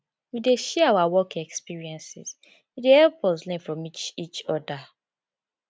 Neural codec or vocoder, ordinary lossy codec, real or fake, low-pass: none; none; real; none